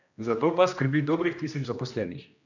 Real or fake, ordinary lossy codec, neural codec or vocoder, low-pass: fake; none; codec, 16 kHz, 1 kbps, X-Codec, HuBERT features, trained on general audio; 7.2 kHz